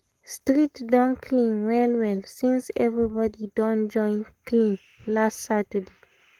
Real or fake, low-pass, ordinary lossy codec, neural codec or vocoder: fake; 19.8 kHz; Opus, 24 kbps; codec, 44.1 kHz, 7.8 kbps, Pupu-Codec